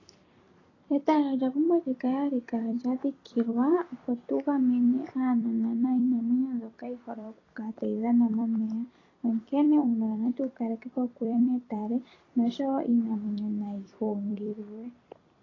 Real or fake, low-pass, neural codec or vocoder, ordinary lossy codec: fake; 7.2 kHz; vocoder, 44.1 kHz, 128 mel bands every 256 samples, BigVGAN v2; AAC, 32 kbps